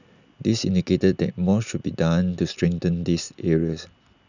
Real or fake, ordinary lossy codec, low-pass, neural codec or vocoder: real; none; 7.2 kHz; none